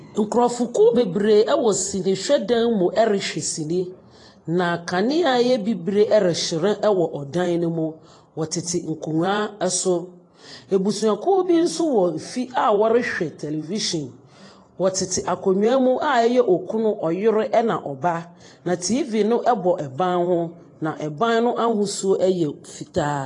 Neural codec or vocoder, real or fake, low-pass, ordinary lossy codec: vocoder, 44.1 kHz, 128 mel bands every 512 samples, BigVGAN v2; fake; 10.8 kHz; AAC, 32 kbps